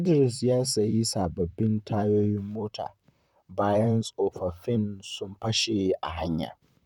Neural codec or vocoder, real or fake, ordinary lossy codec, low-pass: vocoder, 44.1 kHz, 128 mel bands, Pupu-Vocoder; fake; none; 19.8 kHz